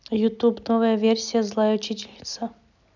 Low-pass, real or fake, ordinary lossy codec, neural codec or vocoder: 7.2 kHz; real; none; none